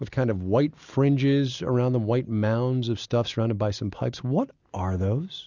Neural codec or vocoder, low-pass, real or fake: none; 7.2 kHz; real